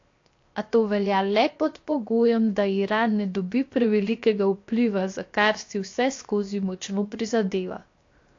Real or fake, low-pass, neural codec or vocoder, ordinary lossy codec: fake; 7.2 kHz; codec, 16 kHz, 0.7 kbps, FocalCodec; AAC, 48 kbps